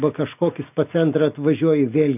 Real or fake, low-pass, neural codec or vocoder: real; 3.6 kHz; none